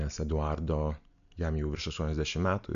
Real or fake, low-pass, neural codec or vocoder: real; 7.2 kHz; none